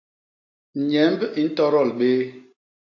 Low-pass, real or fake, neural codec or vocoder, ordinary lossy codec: 7.2 kHz; real; none; AAC, 48 kbps